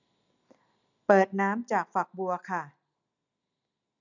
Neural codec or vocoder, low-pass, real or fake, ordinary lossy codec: none; 7.2 kHz; real; none